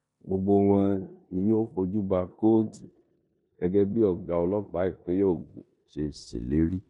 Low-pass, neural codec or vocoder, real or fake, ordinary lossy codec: 10.8 kHz; codec, 16 kHz in and 24 kHz out, 0.9 kbps, LongCat-Audio-Codec, four codebook decoder; fake; none